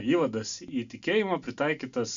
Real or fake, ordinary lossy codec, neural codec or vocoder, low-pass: real; Opus, 64 kbps; none; 7.2 kHz